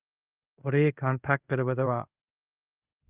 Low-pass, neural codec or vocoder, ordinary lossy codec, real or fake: 3.6 kHz; codec, 24 kHz, 0.5 kbps, DualCodec; Opus, 32 kbps; fake